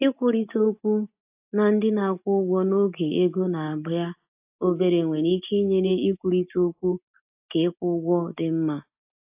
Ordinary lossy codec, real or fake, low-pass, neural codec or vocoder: AAC, 32 kbps; real; 3.6 kHz; none